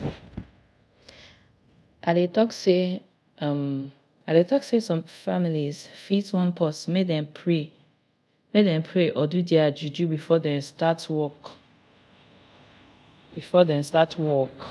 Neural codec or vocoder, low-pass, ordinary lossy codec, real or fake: codec, 24 kHz, 0.5 kbps, DualCodec; none; none; fake